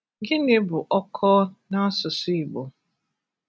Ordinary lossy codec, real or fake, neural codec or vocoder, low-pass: none; real; none; none